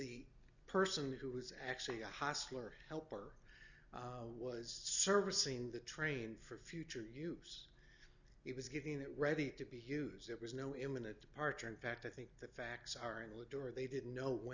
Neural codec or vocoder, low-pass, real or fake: none; 7.2 kHz; real